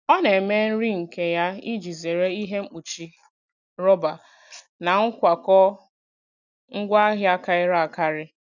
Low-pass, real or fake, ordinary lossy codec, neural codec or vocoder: 7.2 kHz; real; none; none